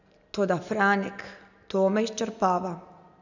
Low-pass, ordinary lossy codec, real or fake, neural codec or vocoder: 7.2 kHz; none; real; none